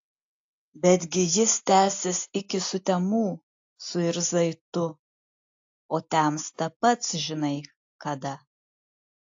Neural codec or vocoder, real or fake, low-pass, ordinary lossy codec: none; real; 7.2 kHz; AAC, 48 kbps